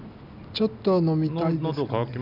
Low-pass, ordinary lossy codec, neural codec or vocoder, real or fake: 5.4 kHz; none; none; real